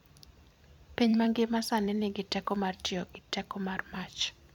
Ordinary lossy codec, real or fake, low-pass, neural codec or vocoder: none; fake; 19.8 kHz; vocoder, 44.1 kHz, 128 mel bands every 256 samples, BigVGAN v2